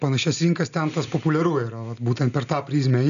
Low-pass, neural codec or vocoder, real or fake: 7.2 kHz; none; real